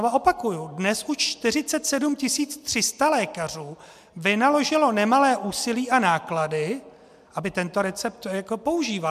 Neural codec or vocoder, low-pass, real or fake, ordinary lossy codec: none; 14.4 kHz; real; MP3, 96 kbps